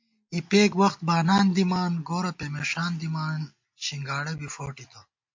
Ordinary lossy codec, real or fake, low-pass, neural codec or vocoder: MP3, 48 kbps; real; 7.2 kHz; none